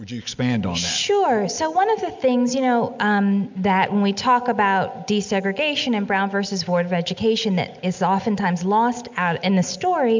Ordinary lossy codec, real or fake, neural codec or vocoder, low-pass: MP3, 64 kbps; real; none; 7.2 kHz